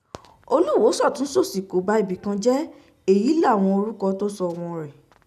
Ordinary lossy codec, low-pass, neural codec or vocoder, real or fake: none; 14.4 kHz; none; real